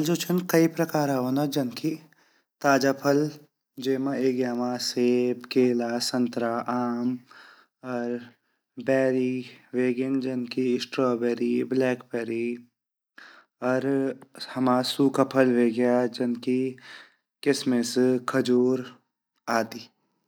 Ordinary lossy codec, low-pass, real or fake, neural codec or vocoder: none; none; real; none